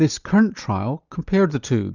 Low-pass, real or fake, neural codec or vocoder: 7.2 kHz; real; none